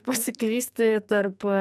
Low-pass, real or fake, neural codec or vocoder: 14.4 kHz; fake; codec, 44.1 kHz, 2.6 kbps, SNAC